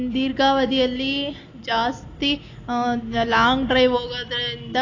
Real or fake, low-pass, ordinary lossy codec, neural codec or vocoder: real; 7.2 kHz; AAC, 32 kbps; none